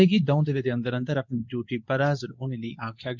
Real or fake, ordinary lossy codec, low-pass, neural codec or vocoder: fake; none; 7.2 kHz; codec, 24 kHz, 0.9 kbps, WavTokenizer, medium speech release version 2